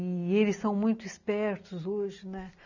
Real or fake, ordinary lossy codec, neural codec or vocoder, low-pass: real; none; none; 7.2 kHz